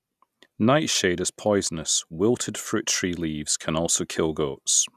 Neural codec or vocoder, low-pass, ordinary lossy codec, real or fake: none; 14.4 kHz; none; real